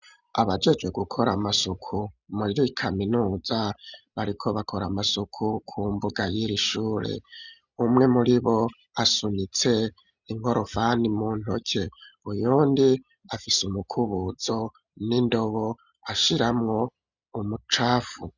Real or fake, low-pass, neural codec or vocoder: real; 7.2 kHz; none